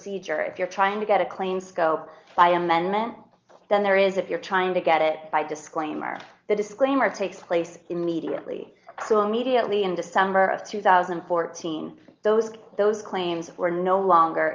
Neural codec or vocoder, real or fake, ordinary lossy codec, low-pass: none; real; Opus, 32 kbps; 7.2 kHz